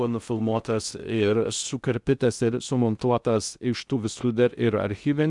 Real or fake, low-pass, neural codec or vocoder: fake; 10.8 kHz; codec, 16 kHz in and 24 kHz out, 0.6 kbps, FocalCodec, streaming, 2048 codes